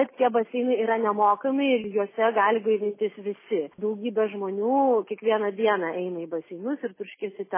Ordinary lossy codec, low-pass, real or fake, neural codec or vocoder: MP3, 16 kbps; 3.6 kHz; real; none